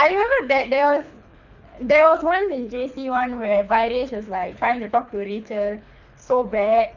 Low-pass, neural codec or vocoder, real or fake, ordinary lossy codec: 7.2 kHz; codec, 24 kHz, 3 kbps, HILCodec; fake; none